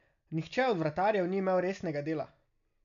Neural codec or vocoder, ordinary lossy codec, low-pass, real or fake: none; none; 7.2 kHz; real